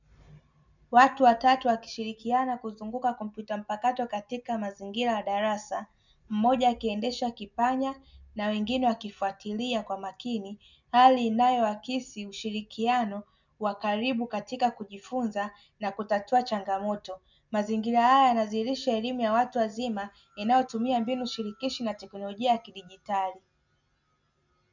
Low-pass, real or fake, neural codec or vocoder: 7.2 kHz; real; none